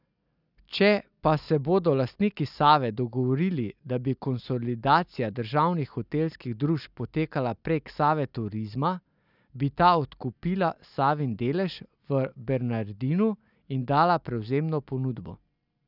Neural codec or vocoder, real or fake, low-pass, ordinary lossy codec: none; real; 5.4 kHz; none